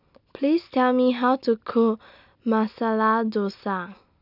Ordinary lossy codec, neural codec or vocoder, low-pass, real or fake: none; none; 5.4 kHz; real